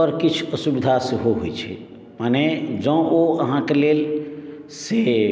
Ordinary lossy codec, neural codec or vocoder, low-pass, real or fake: none; none; none; real